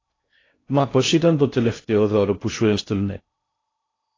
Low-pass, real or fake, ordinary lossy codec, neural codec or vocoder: 7.2 kHz; fake; AAC, 32 kbps; codec, 16 kHz in and 24 kHz out, 0.6 kbps, FocalCodec, streaming, 2048 codes